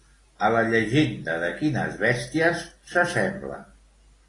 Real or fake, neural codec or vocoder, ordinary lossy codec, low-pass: real; none; AAC, 32 kbps; 10.8 kHz